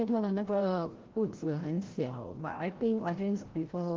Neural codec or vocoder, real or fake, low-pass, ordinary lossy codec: codec, 16 kHz, 0.5 kbps, FreqCodec, larger model; fake; 7.2 kHz; Opus, 16 kbps